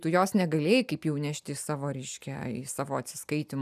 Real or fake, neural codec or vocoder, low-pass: real; none; 14.4 kHz